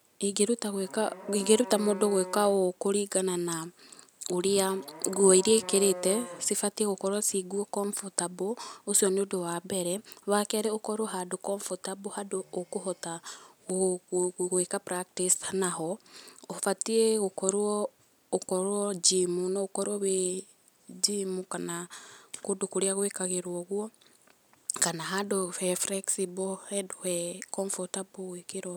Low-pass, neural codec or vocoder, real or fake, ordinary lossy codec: none; none; real; none